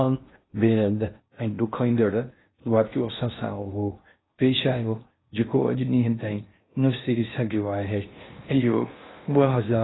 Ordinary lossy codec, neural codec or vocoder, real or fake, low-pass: AAC, 16 kbps; codec, 16 kHz in and 24 kHz out, 0.6 kbps, FocalCodec, streaming, 4096 codes; fake; 7.2 kHz